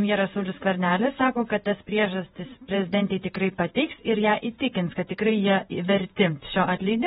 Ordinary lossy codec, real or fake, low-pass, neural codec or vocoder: AAC, 16 kbps; fake; 19.8 kHz; vocoder, 48 kHz, 128 mel bands, Vocos